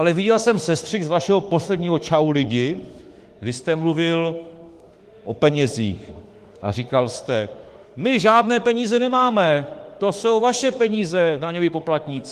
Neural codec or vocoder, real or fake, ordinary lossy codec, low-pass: autoencoder, 48 kHz, 32 numbers a frame, DAC-VAE, trained on Japanese speech; fake; Opus, 24 kbps; 14.4 kHz